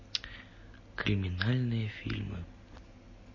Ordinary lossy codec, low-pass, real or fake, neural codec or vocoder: MP3, 32 kbps; 7.2 kHz; real; none